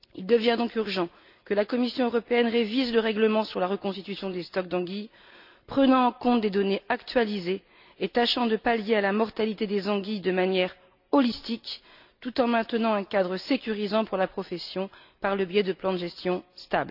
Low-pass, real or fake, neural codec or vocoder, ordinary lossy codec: 5.4 kHz; real; none; none